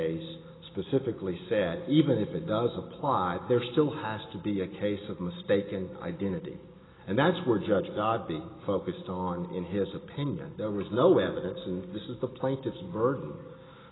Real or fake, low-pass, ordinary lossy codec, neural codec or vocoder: real; 7.2 kHz; AAC, 16 kbps; none